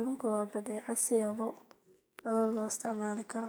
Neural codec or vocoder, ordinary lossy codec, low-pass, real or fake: codec, 44.1 kHz, 2.6 kbps, SNAC; none; none; fake